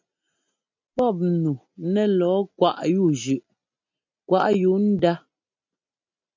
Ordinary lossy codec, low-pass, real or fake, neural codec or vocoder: MP3, 64 kbps; 7.2 kHz; real; none